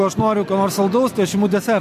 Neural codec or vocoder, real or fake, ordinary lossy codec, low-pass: none; real; MP3, 64 kbps; 14.4 kHz